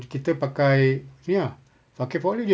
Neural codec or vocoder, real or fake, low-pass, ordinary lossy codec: none; real; none; none